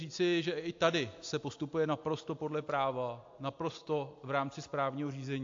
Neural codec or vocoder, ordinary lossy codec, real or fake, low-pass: none; AAC, 48 kbps; real; 7.2 kHz